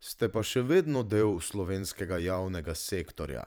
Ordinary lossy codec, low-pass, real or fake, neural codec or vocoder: none; none; fake; vocoder, 44.1 kHz, 128 mel bands every 512 samples, BigVGAN v2